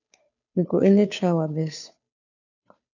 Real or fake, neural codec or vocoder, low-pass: fake; codec, 16 kHz, 2 kbps, FunCodec, trained on Chinese and English, 25 frames a second; 7.2 kHz